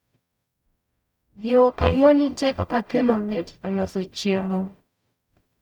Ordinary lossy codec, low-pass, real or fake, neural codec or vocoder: none; 19.8 kHz; fake; codec, 44.1 kHz, 0.9 kbps, DAC